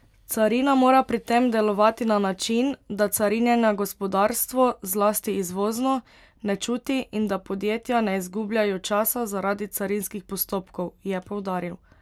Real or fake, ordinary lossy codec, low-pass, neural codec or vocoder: real; MP3, 96 kbps; 19.8 kHz; none